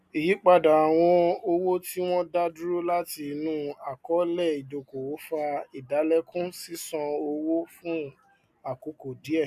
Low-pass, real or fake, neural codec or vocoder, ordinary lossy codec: 14.4 kHz; real; none; none